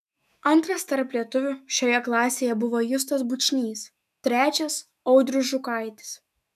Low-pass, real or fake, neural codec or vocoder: 14.4 kHz; fake; autoencoder, 48 kHz, 128 numbers a frame, DAC-VAE, trained on Japanese speech